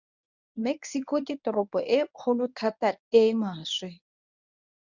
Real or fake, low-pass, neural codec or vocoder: fake; 7.2 kHz; codec, 24 kHz, 0.9 kbps, WavTokenizer, medium speech release version 1